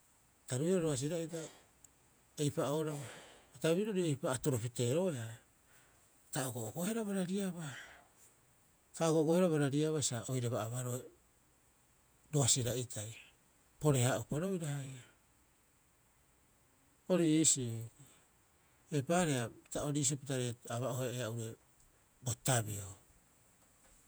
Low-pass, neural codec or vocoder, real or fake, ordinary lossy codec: none; vocoder, 48 kHz, 128 mel bands, Vocos; fake; none